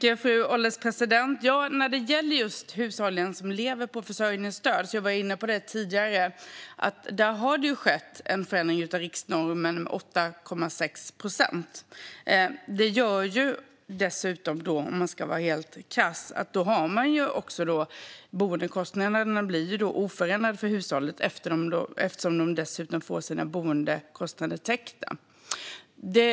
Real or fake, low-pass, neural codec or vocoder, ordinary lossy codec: real; none; none; none